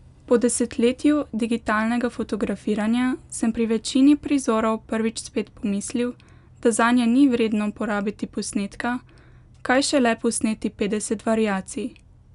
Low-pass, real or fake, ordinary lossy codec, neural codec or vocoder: 10.8 kHz; real; none; none